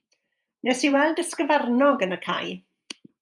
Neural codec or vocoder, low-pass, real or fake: vocoder, 48 kHz, 128 mel bands, Vocos; 10.8 kHz; fake